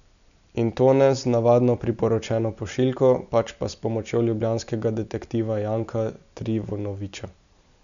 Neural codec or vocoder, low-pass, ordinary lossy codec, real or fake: none; 7.2 kHz; none; real